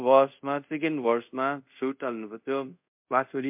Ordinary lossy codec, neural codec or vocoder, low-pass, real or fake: MP3, 32 kbps; codec, 24 kHz, 0.5 kbps, DualCodec; 3.6 kHz; fake